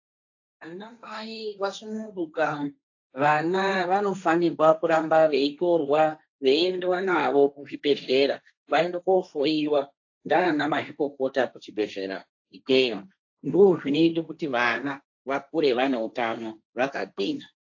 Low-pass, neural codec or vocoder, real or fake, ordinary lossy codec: 7.2 kHz; codec, 16 kHz, 1.1 kbps, Voila-Tokenizer; fake; AAC, 48 kbps